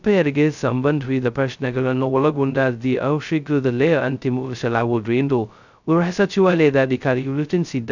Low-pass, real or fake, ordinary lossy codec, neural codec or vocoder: 7.2 kHz; fake; none; codec, 16 kHz, 0.2 kbps, FocalCodec